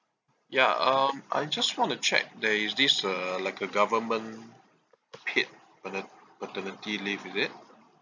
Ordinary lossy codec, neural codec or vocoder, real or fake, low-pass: none; none; real; 7.2 kHz